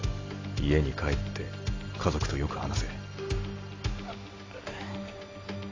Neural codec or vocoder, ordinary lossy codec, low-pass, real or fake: none; AAC, 32 kbps; 7.2 kHz; real